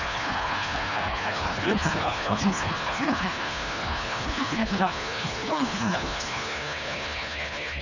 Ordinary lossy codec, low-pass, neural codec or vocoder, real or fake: none; 7.2 kHz; codec, 24 kHz, 1.5 kbps, HILCodec; fake